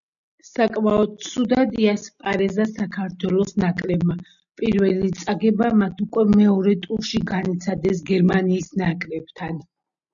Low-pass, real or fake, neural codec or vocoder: 7.2 kHz; real; none